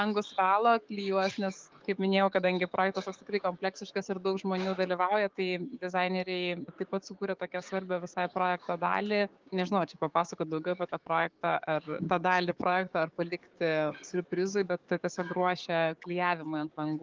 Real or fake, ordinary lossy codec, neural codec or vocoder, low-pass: fake; Opus, 32 kbps; codec, 16 kHz, 6 kbps, DAC; 7.2 kHz